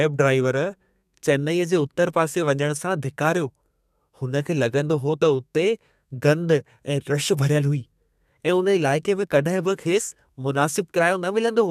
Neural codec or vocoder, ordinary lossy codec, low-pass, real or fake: codec, 32 kHz, 1.9 kbps, SNAC; none; 14.4 kHz; fake